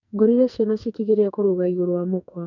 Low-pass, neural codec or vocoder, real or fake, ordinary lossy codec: 7.2 kHz; codec, 44.1 kHz, 2.6 kbps, SNAC; fake; none